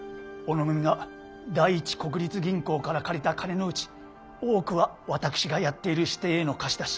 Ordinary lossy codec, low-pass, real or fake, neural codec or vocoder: none; none; real; none